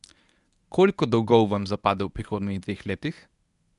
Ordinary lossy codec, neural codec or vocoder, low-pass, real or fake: none; codec, 24 kHz, 0.9 kbps, WavTokenizer, medium speech release version 1; 10.8 kHz; fake